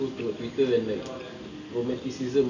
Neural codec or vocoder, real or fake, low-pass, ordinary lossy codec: none; real; 7.2 kHz; none